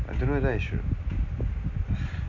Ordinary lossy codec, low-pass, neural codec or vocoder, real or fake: none; 7.2 kHz; none; real